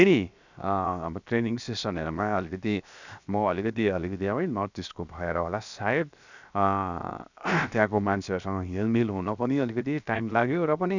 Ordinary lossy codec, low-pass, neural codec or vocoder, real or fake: none; 7.2 kHz; codec, 16 kHz, 0.7 kbps, FocalCodec; fake